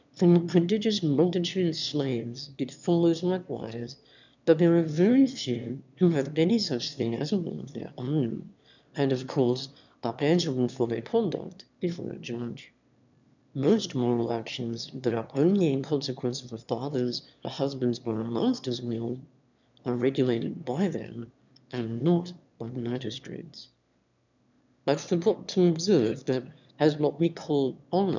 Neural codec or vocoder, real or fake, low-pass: autoencoder, 22.05 kHz, a latent of 192 numbers a frame, VITS, trained on one speaker; fake; 7.2 kHz